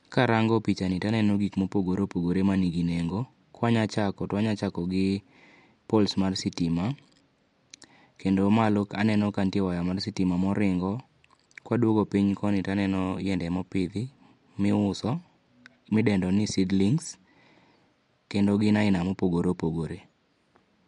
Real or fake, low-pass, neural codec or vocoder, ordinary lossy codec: real; 10.8 kHz; none; MP3, 64 kbps